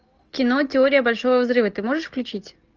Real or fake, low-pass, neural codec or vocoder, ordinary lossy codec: real; 7.2 kHz; none; Opus, 24 kbps